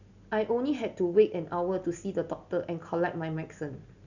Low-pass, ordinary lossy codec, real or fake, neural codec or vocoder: 7.2 kHz; none; real; none